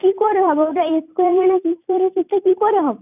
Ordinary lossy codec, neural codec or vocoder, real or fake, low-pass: none; vocoder, 44.1 kHz, 128 mel bands every 256 samples, BigVGAN v2; fake; 3.6 kHz